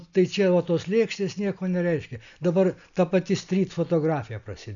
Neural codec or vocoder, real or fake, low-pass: none; real; 7.2 kHz